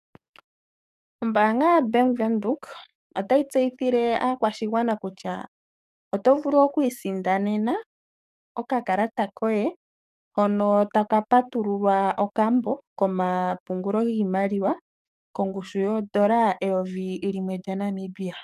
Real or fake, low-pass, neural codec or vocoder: fake; 14.4 kHz; codec, 44.1 kHz, 7.8 kbps, DAC